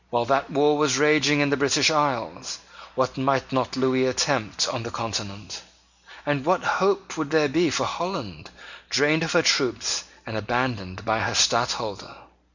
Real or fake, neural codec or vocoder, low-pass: real; none; 7.2 kHz